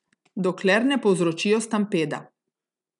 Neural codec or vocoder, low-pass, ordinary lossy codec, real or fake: none; 10.8 kHz; none; real